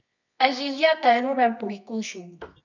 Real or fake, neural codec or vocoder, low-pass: fake; codec, 24 kHz, 0.9 kbps, WavTokenizer, medium music audio release; 7.2 kHz